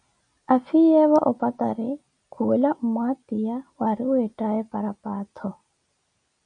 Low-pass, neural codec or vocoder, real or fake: 9.9 kHz; none; real